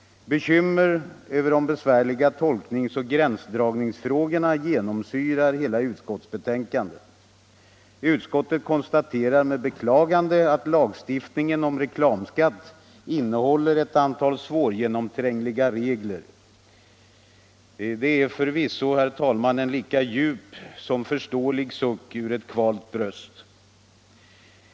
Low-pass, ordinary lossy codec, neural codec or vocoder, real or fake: none; none; none; real